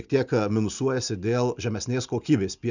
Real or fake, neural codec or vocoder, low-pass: real; none; 7.2 kHz